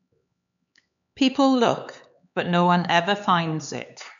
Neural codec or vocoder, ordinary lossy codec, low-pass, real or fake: codec, 16 kHz, 4 kbps, X-Codec, HuBERT features, trained on LibriSpeech; none; 7.2 kHz; fake